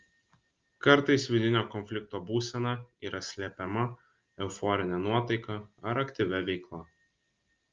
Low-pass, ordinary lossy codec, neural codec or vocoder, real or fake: 7.2 kHz; Opus, 32 kbps; none; real